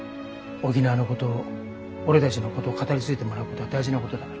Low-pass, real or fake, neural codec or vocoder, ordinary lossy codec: none; real; none; none